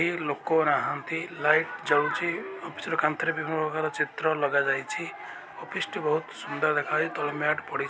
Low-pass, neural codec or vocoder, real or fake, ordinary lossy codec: none; none; real; none